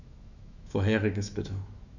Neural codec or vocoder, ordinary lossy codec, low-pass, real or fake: autoencoder, 48 kHz, 128 numbers a frame, DAC-VAE, trained on Japanese speech; none; 7.2 kHz; fake